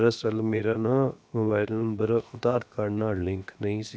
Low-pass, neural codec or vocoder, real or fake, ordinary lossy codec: none; codec, 16 kHz, 0.7 kbps, FocalCodec; fake; none